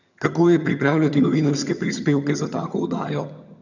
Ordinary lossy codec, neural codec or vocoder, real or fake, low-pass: none; vocoder, 22.05 kHz, 80 mel bands, HiFi-GAN; fake; 7.2 kHz